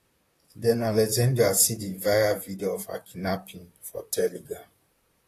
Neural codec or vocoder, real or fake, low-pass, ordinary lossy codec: vocoder, 44.1 kHz, 128 mel bands, Pupu-Vocoder; fake; 14.4 kHz; AAC, 48 kbps